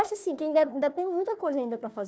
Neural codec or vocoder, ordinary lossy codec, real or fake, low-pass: codec, 16 kHz, 1 kbps, FunCodec, trained on Chinese and English, 50 frames a second; none; fake; none